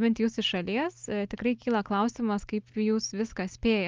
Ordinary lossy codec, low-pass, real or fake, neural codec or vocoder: Opus, 32 kbps; 7.2 kHz; real; none